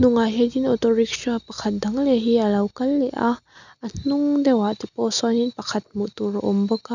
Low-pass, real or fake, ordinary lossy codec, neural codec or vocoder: 7.2 kHz; real; none; none